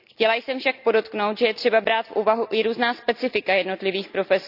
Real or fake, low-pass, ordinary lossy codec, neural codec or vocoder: real; 5.4 kHz; none; none